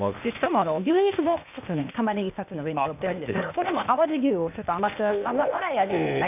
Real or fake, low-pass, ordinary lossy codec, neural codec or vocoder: fake; 3.6 kHz; none; codec, 16 kHz, 0.8 kbps, ZipCodec